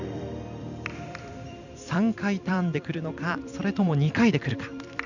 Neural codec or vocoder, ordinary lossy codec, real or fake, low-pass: vocoder, 44.1 kHz, 128 mel bands every 256 samples, BigVGAN v2; none; fake; 7.2 kHz